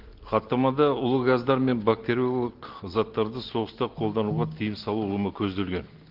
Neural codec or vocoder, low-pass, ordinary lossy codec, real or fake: none; 5.4 kHz; Opus, 16 kbps; real